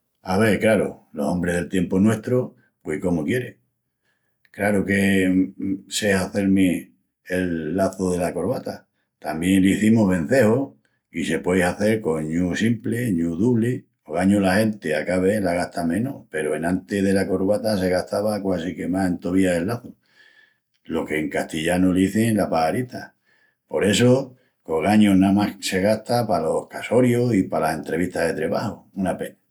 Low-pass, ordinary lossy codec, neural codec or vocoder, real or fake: 19.8 kHz; none; none; real